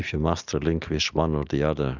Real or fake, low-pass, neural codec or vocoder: real; 7.2 kHz; none